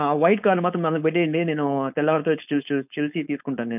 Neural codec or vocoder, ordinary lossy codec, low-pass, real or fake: codec, 16 kHz, 4.8 kbps, FACodec; none; 3.6 kHz; fake